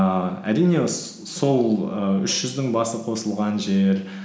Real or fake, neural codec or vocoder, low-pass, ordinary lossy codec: real; none; none; none